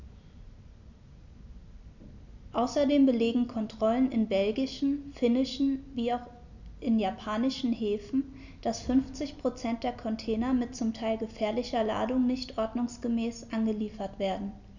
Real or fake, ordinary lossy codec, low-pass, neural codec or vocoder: real; none; 7.2 kHz; none